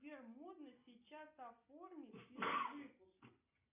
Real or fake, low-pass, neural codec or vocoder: real; 3.6 kHz; none